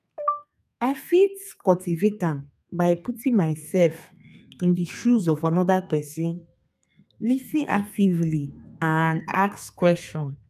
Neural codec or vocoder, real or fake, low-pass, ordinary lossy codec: codec, 32 kHz, 1.9 kbps, SNAC; fake; 14.4 kHz; none